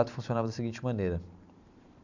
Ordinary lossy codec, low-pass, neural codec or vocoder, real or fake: none; 7.2 kHz; vocoder, 44.1 kHz, 128 mel bands every 512 samples, BigVGAN v2; fake